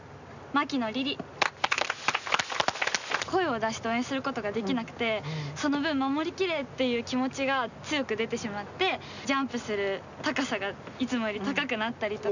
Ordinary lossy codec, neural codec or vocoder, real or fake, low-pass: none; none; real; 7.2 kHz